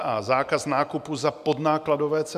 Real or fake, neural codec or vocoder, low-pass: real; none; 14.4 kHz